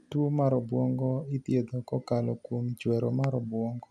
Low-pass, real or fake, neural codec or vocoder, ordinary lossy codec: none; real; none; none